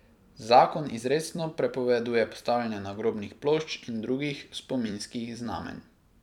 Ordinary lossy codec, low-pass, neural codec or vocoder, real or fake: none; 19.8 kHz; vocoder, 44.1 kHz, 128 mel bands every 256 samples, BigVGAN v2; fake